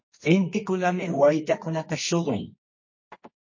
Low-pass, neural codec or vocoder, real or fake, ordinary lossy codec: 7.2 kHz; codec, 24 kHz, 0.9 kbps, WavTokenizer, medium music audio release; fake; MP3, 32 kbps